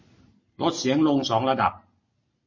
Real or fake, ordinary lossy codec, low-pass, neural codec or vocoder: real; MP3, 32 kbps; 7.2 kHz; none